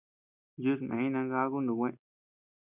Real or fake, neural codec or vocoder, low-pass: real; none; 3.6 kHz